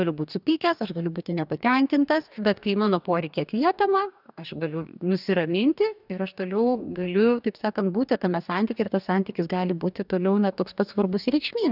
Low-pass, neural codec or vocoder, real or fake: 5.4 kHz; codec, 44.1 kHz, 2.6 kbps, DAC; fake